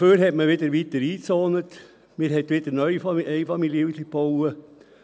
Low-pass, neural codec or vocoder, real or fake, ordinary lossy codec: none; none; real; none